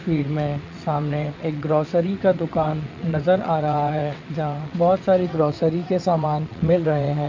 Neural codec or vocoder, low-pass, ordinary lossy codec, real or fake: vocoder, 22.05 kHz, 80 mel bands, WaveNeXt; 7.2 kHz; MP3, 64 kbps; fake